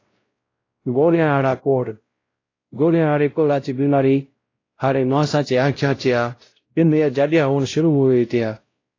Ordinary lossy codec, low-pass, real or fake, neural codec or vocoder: AAC, 32 kbps; 7.2 kHz; fake; codec, 16 kHz, 0.5 kbps, X-Codec, WavLM features, trained on Multilingual LibriSpeech